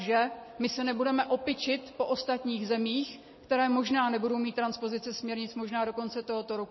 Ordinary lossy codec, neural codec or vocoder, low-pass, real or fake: MP3, 24 kbps; none; 7.2 kHz; real